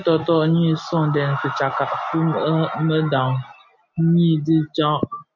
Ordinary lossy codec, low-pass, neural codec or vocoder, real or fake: MP3, 48 kbps; 7.2 kHz; none; real